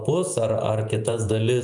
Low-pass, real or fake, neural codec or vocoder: 10.8 kHz; fake; vocoder, 48 kHz, 128 mel bands, Vocos